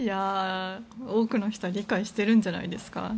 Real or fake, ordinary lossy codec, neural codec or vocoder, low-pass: real; none; none; none